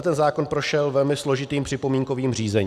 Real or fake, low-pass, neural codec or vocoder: real; 14.4 kHz; none